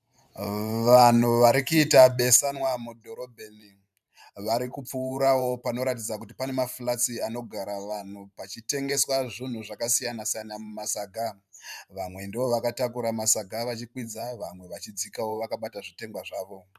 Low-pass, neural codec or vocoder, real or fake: 14.4 kHz; vocoder, 44.1 kHz, 128 mel bands every 512 samples, BigVGAN v2; fake